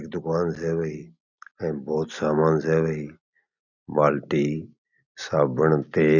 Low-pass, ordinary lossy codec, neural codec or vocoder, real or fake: 7.2 kHz; none; none; real